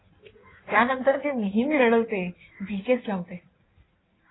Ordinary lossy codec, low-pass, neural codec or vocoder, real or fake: AAC, 16 kbps; 7.2 kHz; codec, 16 kHz in and 24 kHz out, 1.1 kbps, FireRedTTS-2 codec; fake